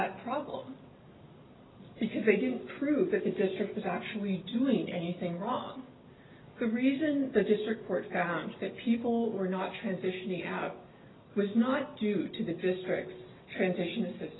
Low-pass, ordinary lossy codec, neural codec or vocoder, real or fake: 7.2 kHz; AAC, 16 kbps; none; real